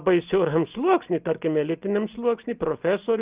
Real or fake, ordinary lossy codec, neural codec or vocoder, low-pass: real; Opus, 32 kbps; none; 3.6 kHz